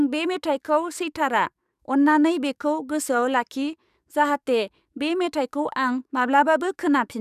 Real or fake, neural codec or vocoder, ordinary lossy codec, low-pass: fake; codec, 44.1 kHz, 7.8 kbps, DAC; none; 14.4 kHz